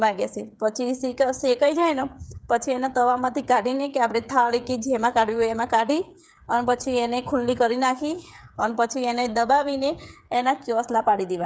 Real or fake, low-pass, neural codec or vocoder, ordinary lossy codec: fake; none; codec, 16 kHz, 8 kbps, FreqCodec, smaller model; none